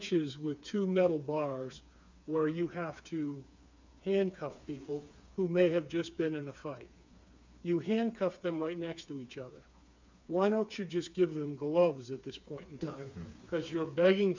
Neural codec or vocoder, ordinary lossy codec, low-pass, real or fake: codec, 16 kHz, 4 kbps, FreqCodec, smaller model; MP3, 64 kbps; 7.2 kHz; fake